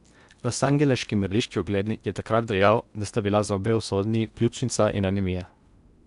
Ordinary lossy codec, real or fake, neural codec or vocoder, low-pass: none; fake; codec, 16 kHz in and 24 kHz out, 0.8 kbps, FocalCodec, streaming, 65536 codes; 10.8 kHz